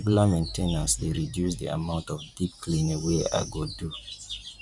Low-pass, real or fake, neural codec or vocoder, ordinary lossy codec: 10.8 kHz; fake; vocoder, 44.1 kHz, 128 mel bands every 512 samples, BigVGAN v2; none